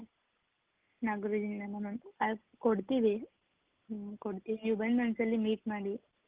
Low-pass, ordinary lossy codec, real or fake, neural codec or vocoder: 3.6 kHz; Opus, 16 kbps; real; none